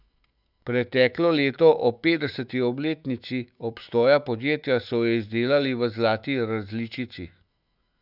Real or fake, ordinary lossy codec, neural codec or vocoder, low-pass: fake; none; autoencoder, 48 kHz, 128 numbers a frame, DAC-VAE, trained on Japanese speech; 5.4 kHz